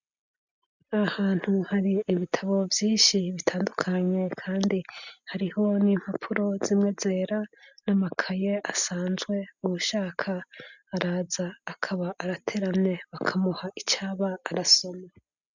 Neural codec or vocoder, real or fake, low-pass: none; real; 7.2 kHz